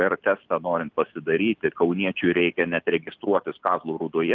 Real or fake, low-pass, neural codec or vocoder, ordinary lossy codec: real; 7.2 kHz; none; Opus, 24 kbps